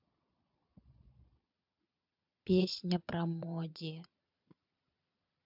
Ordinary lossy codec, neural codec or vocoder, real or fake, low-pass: MP3, 48 kbps; vocoder, 22.05 kHz, 80 mel bands, Vocos; fake; 5.4 kHz